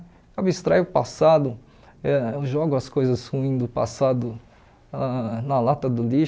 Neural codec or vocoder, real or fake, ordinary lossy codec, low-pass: none; real; none; none